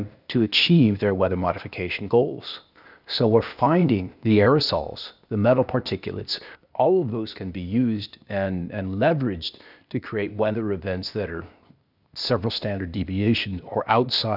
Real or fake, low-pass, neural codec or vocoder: fake; 5.4 kHz; codec, 16 kHz, 0.8 kbps, ZipCodec